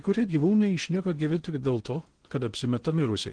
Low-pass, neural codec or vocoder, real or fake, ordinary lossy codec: 9.9 kHz; codec, 16 kHz in and 24 kHz out, 0.6 kbps, FocalCodec, streaming, 2048 codes; fake; Opus, 16 kbps